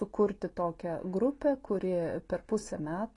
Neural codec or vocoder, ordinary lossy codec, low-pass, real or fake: none; AAC, 32 kbps; 10.8 kHz; real